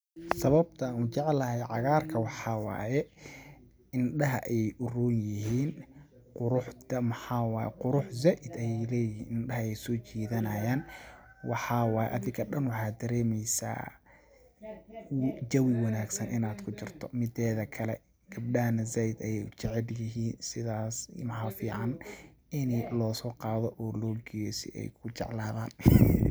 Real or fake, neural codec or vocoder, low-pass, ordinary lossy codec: real; none; none; none